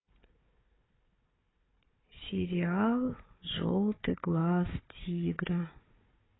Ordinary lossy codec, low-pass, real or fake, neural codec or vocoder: AAC, 16 kbps; 7.2 kHz; fake; codec, 16 kHz, 4 kbps, FunCodec, trained on Chinese and English, 50 frames a second